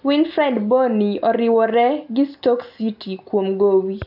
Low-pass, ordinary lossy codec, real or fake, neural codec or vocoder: 5.4 kHz; AAC, 48 kbps; real; none